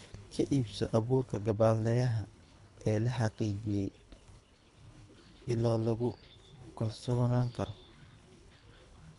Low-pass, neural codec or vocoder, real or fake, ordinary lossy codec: 10.8 kHz; codec, 24 kHz, 3 kbps, HILCodec; fake; none